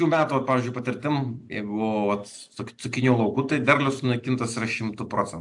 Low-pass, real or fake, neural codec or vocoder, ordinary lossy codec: 10.8 kHz; real; none; AAC, 64 kbps